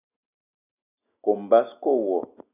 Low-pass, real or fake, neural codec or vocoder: 3.6 kHz; real; none